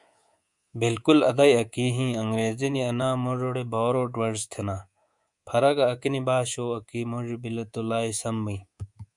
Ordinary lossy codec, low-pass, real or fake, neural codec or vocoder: Opus, 64 kbps; 10.8 kHz; fake; codec, 24 kHz, 3.1 kbps, DualCodec